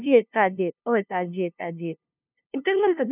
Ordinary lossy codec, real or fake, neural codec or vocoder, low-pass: none; fake; codec, 16 kHz, 0.5 kbps, FunCodec, trained on LibriTTS, 25 frames a second; 3.6 kHz